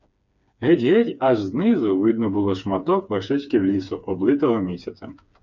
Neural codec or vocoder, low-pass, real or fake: codec, 16 kHz, 4 kbps, FreqCodec, smaller model; 7.2 kHz; fake